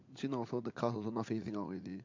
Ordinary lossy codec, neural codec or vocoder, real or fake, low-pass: MP3, 48 kbps; vocoder, 44.1 kHz, 80 mel bands, Vocos; fake; 7.2 kHz